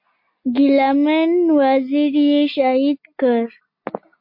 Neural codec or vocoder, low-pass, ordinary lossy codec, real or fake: none; 5.4 kHz; MP3, 32 kbps; real